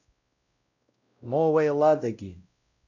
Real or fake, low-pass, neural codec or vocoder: fake; 7.2 kHz; codec, 16 kHz, 0.5 kbps, X-Codec, WavLM features, trained on Multilingual LibriSpeech